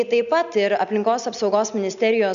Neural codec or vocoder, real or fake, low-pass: none; real; 7.2 kHz